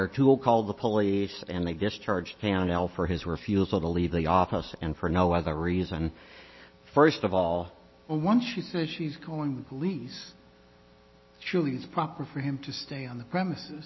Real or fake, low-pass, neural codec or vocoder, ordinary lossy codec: real; 7.2 kHz; none; MP3, 24 kbps